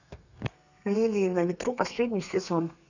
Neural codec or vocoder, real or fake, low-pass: codec, 32 kHz, 1.9 kbps, SNAC; fake; 7.2 kHz